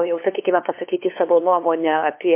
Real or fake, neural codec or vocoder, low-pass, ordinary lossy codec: fake; codec, 16 kHz, 2 kbps, FunCodec, trained on LibriTTS, 25 frames a second; 3.6 kHz; MP3, 24 kbps